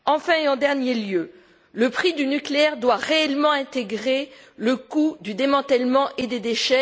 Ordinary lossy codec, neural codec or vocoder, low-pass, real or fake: none; none; none; real